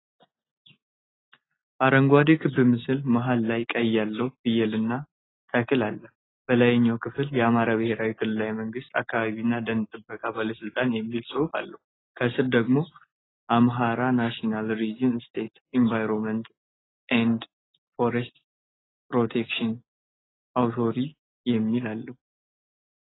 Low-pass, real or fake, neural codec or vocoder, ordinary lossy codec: 7.2 kHz; real; none; AAC, 16 kbps